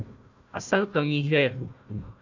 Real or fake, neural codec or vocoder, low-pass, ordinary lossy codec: fake; codec, 16 kHz, 1 kbps, FunCodec, trained on Chinese and English, 50 frames a second; 7.2 kHz; AAC, 48 kbps